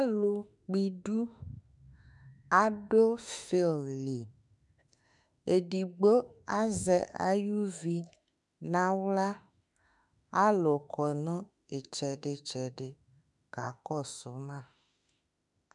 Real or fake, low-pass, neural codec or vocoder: fake; 10.8 kHz; autoencoder, 48 kHz, 32 numbers a frame, DAC-VAE, trained on Japanese speech